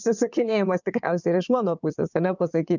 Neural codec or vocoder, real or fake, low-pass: vocoder, 22.05 kHz, 80 mel bands, WaveNeXt; fake; 7.2 kHz